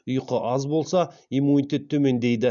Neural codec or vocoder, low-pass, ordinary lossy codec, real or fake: none; 7.2 kHz; none; real